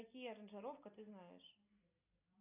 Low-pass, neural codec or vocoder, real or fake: 3.6 kHz; none; real